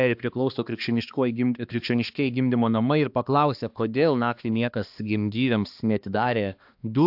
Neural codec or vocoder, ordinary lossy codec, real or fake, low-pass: codec, 16 kHz, 2 kbps, X-Codec, HuBERT features, trained on balanced general audio; AAC, 48 kbps; fake; 5.4 kHz